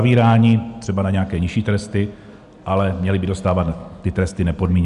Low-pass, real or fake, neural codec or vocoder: 10.8 kHz; real; none